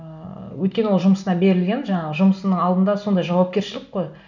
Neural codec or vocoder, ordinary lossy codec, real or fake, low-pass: none; none; real; 7.2 kHz